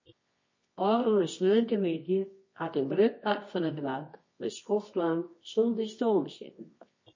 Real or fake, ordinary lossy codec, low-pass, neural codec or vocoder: fake; MP3, 32 kbps; 7.2 kHz; codec, 24 kHz, 0.9 kbps, WavTokenizer, medium music audio release